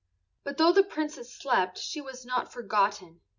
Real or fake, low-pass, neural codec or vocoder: real; 7.2 kHz; none